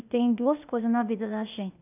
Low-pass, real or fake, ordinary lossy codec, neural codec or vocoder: 3.6 kHz; fake; none; codec, 16 kHz, 0.8 kbps, ZipCodec